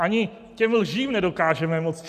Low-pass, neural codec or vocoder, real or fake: 14.4 kHz; none; real